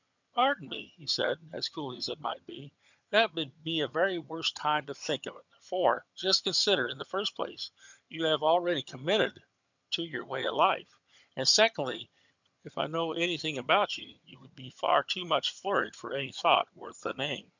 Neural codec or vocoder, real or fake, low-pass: vocoder, 22.05 kHz, 80 mel bands, HiFi-GAN; fake; 7.2 kHz